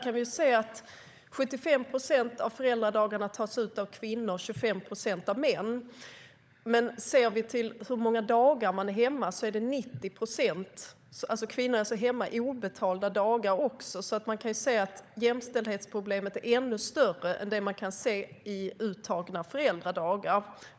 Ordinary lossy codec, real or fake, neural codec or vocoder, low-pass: none; fake; codec, 16 kHz, 16 kbps, FunCodec, trained on LibriTTS, 50 frames a second; none